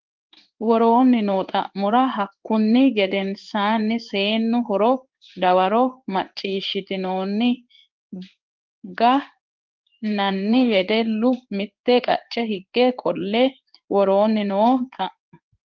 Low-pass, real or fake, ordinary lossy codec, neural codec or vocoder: 7.2 kHz; fake; Opus, 32 kbps; codec, 16 kHz in and 24 kHz out, 1 kbps, XY-Tokenizer